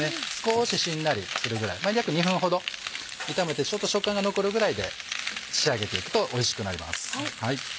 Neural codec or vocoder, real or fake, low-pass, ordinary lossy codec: none; real; none; none